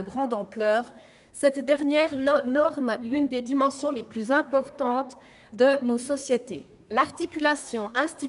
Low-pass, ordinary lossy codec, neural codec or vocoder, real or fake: 10.8 kHz; none; codec, 24 kHz, 1 kbps, SNAC; fake